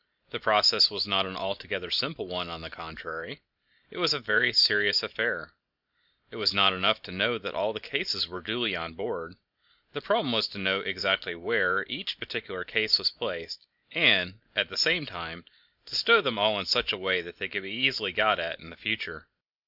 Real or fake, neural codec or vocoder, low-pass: real; none; 5.4 kHz